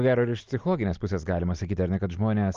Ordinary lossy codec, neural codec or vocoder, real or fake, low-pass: Opus, 24 kbps; none; real; 7.2 kHz